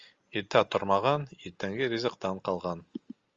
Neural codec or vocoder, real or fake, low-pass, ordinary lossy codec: none; real; 7.2 kHz; Opus, 32 kbps